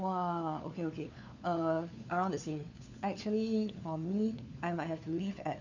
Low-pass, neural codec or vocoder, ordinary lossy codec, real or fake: 7.2 kHz; codec, 16 kHz, 4 kbps, FunCodec, trained on LibriTTS, 50 frames a second; none; fake